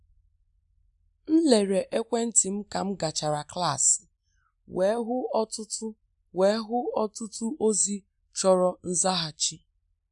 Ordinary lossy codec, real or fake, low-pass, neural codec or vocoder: none; real; 10.8 kHz; none